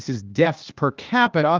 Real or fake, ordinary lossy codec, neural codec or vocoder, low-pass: fake; Opus, 24 kbps; codec, 16 kHz, 0.8 kbps, ZipCodec; 7.2 kHz